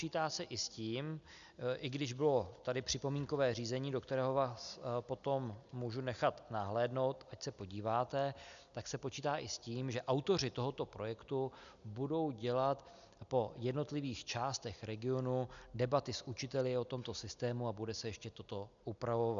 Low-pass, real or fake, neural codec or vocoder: 7.2 kHz; real; none